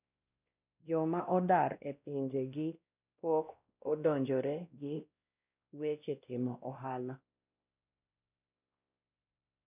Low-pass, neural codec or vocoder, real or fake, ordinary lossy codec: 3.6 kHz; codec, 16 kHz, 1 kbps, X-Codec, WavLM features, trained on Multilingual LibriSpeech; fake; none